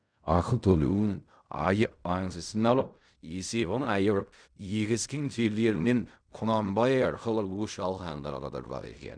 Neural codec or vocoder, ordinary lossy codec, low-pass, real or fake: codec, 16 kHz in and 24 kHz out, 0.4 kbps, LongCat-Audio-Codec, fine tuned four codebook decoder; none; 9.9 kHz; fake